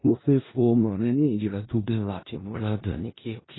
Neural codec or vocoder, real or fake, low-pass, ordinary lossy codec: codec, 16 kHz in and 24 kHz out, 0.4 kbps, LongCat-Audio-Codec, four codebook decoder; fake; 7.2 kHz; AAC, 16 kbps